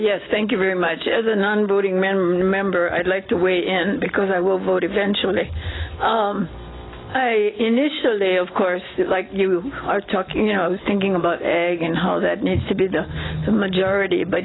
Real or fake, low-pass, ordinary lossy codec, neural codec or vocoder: real; 7.2 kHz; AAC, 16 kbps; none